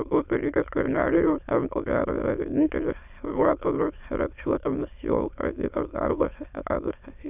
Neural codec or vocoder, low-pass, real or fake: autoencoder, 22.05 kHz, a latent of 192 numbers a frame, VITS, trained on many speakers; 3.6 kHz; fake